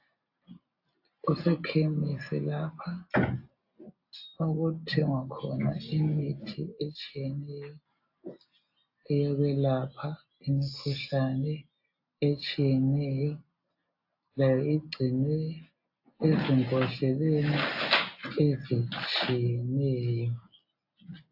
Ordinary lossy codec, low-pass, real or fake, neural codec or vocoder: AAC, 48 kbps; 5.4 kHz; real; none